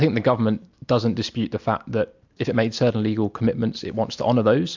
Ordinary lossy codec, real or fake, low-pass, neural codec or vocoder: MP3, 64 kbps; real; 7.2 kHz; none